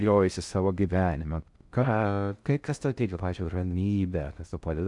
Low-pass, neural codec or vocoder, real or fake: 10.8 kHz; codec, 16 kHz in and 24 kHz out, 0.6 kbps, FocalCodec, streaming, 2048 codes; fake